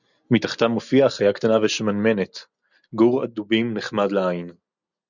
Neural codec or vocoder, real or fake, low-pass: none; real; 7.2 kHz